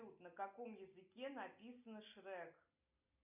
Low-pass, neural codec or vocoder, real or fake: 3.6 kHz; none; real